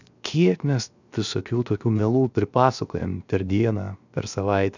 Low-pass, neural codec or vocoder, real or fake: 7.2 kHz; codec, 16 kHz, 0.7 kbps, FocalCodec; fake